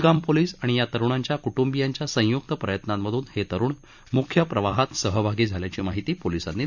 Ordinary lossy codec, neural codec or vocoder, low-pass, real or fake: none; vocoder, 44.1 kHz, 128 mel bands every 256 samples, BigVGAN v2; 7.2 kHz; fake